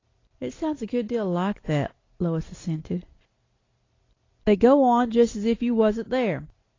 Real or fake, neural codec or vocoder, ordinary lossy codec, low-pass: real; none; AAC, 32 kbps; 7.2 kHz